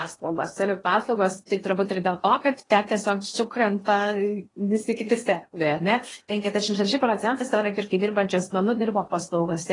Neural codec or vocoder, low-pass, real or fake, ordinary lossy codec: codec, 16 kHz in and 24 kHz out, 0.8 kbps, FocalCodec, streaming, 65536 codes; 10.8 kHz; fake; AAC, 32 kbps